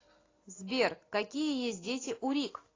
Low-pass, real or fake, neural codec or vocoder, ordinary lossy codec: 7.2 kHz; real; none; AAC, 32 kbps